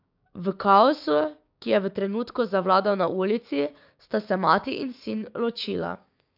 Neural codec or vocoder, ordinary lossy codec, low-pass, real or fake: codec, 16 kHz, 6 kbps, DAC; AAC, 48 kbps; 5.4 kHz; fake